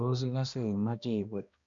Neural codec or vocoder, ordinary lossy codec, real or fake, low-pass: codec, 16 kHz, 1 kbps, X-Codec, HuBERT features, trained on balanced general audio; Opus, 64 kbps; fake; 7.2 kHz